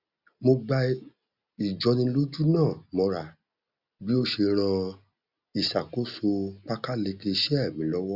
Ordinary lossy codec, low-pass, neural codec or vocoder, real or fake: Opus, 64 kbps; 5.4 kHz; none; real